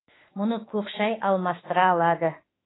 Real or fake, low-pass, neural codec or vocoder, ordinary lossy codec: fake; 7.2 kHz; autoencoder, 48 kHz, 32 numbers a frame, DAC-VAE, trained on Japanese speech; AAC, 16 kbps